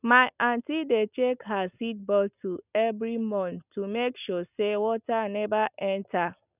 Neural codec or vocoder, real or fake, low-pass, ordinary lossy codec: none; real; 3.6 kHz; none